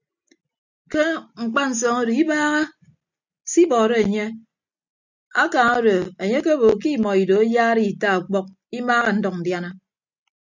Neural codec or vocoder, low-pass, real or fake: none; 7.2 kHz; real